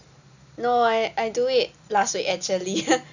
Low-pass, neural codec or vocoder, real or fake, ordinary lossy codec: 7.2 kHz; none; real; none